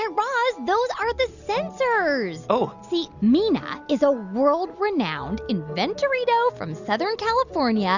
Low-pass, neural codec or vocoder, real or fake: 7.2 kHz; none; real